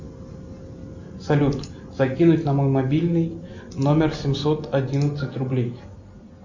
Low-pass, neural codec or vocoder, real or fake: 7.2 kHz; none; real